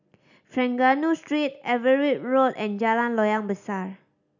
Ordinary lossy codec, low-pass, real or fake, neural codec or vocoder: none; 7.2 kHz; real; none